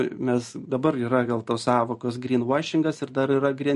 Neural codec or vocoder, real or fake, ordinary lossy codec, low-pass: none; real; MP3, 48 kbps; 14.4 kHz